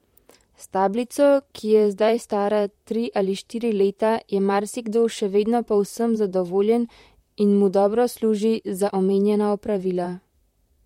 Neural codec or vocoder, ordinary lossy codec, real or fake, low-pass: vocoder, 44.1 kHz, 128 mel bands, Pupu-Vocoder; MP3, 64 kbps; fake; 19.8 kHz